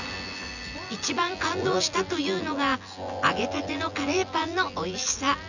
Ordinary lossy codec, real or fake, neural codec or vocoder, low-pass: none; fake; vocoder, 24 kHz, 100 mel bands, Vocos; 7.2 kHz